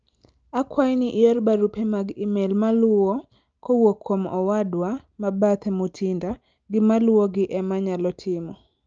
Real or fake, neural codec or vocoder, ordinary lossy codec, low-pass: real; none; Opus, 24 kbps; 7.2 kHz